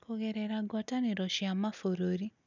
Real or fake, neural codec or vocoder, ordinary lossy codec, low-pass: real; none; none; 7.2 kHz